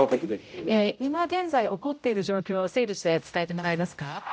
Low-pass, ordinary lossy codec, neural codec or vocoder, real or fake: none; none; codec, 16 kHz, 0.5 kbps, X-Codec, HuBERT features, trained on general audio; fake